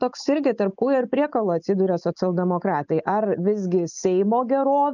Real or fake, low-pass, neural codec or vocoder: real; 7.2 kHz; none